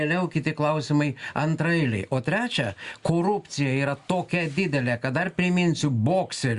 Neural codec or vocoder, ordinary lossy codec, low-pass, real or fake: none; Opus, 64 kbps; 10.8 kHz; real